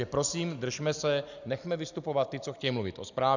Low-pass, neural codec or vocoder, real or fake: 7.2 kHz; none; real